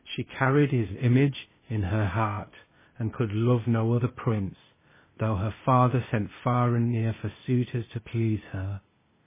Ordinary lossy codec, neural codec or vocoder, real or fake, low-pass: MP3, 16 kbps; codec, 16 kHz, 0.8 kbps, ZipCodec; fake; 3.6 kHz